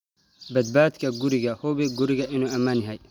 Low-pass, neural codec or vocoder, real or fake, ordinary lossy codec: 19.8 kHz; none; real; none